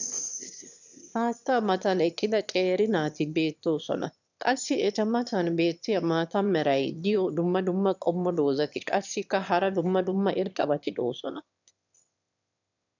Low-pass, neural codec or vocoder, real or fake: 7.2 kHz; autoencoder, 22.05 kHz, a latent of 192 numbers a frame, VITS, trained on one speaker; fake